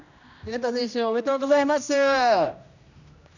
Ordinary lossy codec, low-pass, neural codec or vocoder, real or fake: none; 7.2 kHz; codec, 16 kHz, 1 kbps, X-Codec, HuBERT features, trained on general audio; fake